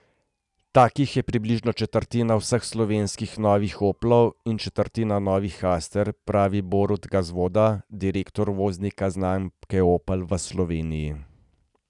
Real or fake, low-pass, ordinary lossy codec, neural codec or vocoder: real; 10.8 kHz; none; none